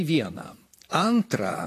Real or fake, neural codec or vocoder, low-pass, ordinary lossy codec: fake; vocoder, 44.1 kHz, 128 mel bands, Pupu-Vocoder; 14.4 kHz; AAC, 48 kbps